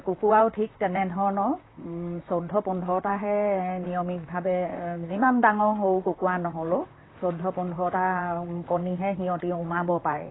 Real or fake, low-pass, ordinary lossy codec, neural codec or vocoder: fake; 7.2 kHz; AAC, 16 kbps; vocoder, 44.1 kHz, 128 mel bands, Pupu-Vocoder